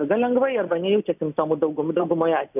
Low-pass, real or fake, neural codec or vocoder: 3.6 kHz; real; none